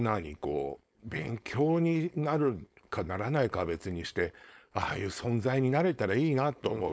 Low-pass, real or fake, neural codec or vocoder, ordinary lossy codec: none; fake; codec, 16 kHz, 4.8 kbps, FACodec; none